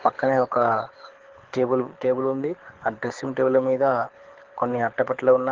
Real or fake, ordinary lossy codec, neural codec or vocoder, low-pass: fake; Opus, 16 kbps; codec, 24 kHz, 6 kbps, HILCodec; 7.2 kHz